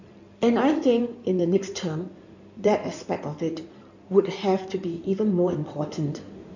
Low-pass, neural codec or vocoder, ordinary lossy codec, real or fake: 7.2 kHz; codec, 16 kHz in and 24 kHz out, 2.2 kbps, FireRedTTS-2 codec; none; fake